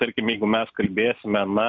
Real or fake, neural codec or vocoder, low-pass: real; none; 7.2 kHz